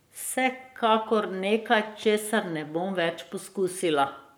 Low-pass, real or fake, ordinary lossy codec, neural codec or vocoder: none; fake; none; codec, 44.1 kHz, 7.8 kbps, Pupu-Codec